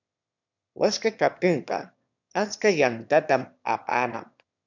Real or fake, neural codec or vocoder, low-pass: fake; autoencoder, 22.05 kHz, a latent of 192 numbers a frame, VITS, trained on one speaker; 7.2 kHz